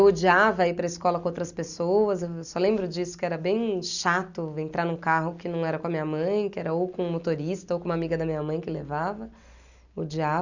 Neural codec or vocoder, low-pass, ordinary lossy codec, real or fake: none; 7.2 kHz; none; real